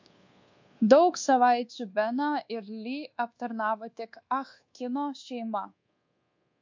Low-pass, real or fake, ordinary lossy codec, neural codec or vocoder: 7.2 kHz; fake; MP3, 48 kbps; codec, 24 kHz, 1.2 kbps, DualCodec